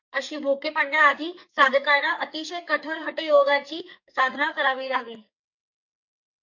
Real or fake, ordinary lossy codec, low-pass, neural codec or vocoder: fake; MP3, 48 kbps; 7.2 kHz; codec, 44.1 kHz, 2.6 kbps, SNAC